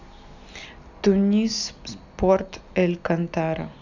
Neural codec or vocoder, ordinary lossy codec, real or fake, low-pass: none; none; real; 7.2 kHz